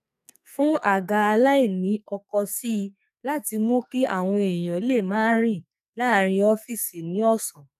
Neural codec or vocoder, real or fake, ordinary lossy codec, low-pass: codec, 44.1 kHz, 2.6 kbps, SNAC; fake; none; 14.4 kHz